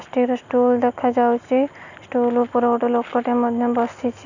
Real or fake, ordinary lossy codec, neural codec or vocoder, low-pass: real; none; none; 7.2 kHz